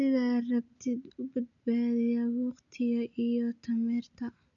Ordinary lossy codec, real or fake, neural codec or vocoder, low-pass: none; real; none; 7.2 kHz